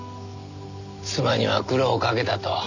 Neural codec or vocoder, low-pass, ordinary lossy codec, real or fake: none; 7.2 kHz; none; real